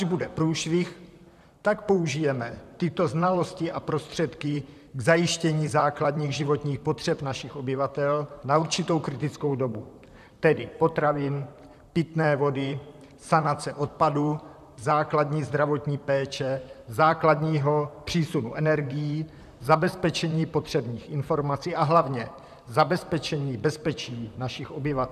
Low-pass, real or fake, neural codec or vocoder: 14.4 kHz; fake; vocoder, 44.1 kHz, 128 mel bands, Pupu-Vocoder